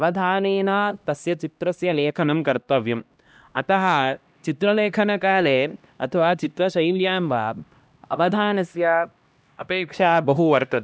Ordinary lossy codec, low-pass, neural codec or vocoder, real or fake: none; none; codec, 16 kHz, 1 kbps, X-Codec, HuBERT features, trained on LibriSpeech; fake